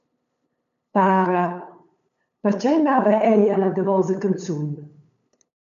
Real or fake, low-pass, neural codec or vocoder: fake; 7.2 kHz; codec, 16 kHz, 8 kbps, FunCodec, trained on LibriTTS, 25 frames a second